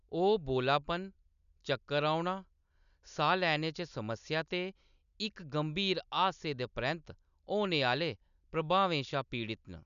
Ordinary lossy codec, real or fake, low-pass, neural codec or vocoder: none; real; 7.2 kHz; none